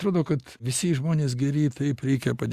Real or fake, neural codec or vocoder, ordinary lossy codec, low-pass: real; none; Opus, 64 kbps; 14.4 kHz